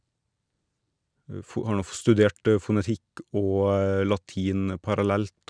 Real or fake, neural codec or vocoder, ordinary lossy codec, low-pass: real; none; none; 9.9 kHz